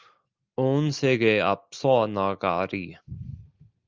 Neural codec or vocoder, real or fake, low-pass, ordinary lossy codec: none; real; 7.2 kHz; Opus, 24 kbps